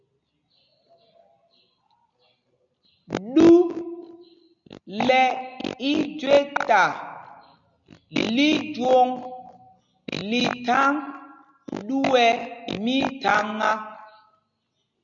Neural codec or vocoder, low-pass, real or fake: none; 7.2 kHz; real